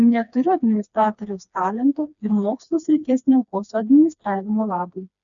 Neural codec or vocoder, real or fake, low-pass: codec, 16 kHz, 2 kbps, FreqCodec, smaller model; fake; 7.2 kHz